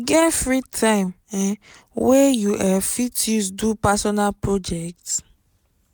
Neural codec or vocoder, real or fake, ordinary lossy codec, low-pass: none; real; none; none